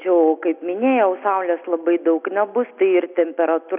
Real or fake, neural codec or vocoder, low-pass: real; none; 3.6 kHz